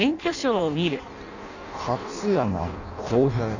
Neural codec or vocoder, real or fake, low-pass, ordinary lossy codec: codec, 16 kHz in and 24 kHz out, 0.6 kbps, FireRedTTS-2 codec; fake; 7.2 kHz; none